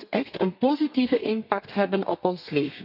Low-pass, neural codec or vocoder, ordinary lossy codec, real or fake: 5.4 kHz; codec, 32 kHz, 1.9 kbps, SNAC; none; fake